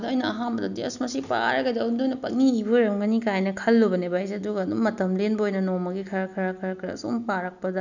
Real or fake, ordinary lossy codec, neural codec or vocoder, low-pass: real; none; none; 7.2 kHz